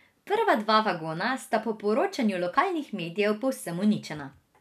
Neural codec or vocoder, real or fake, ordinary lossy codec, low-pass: none; real; none; 14.4 kHz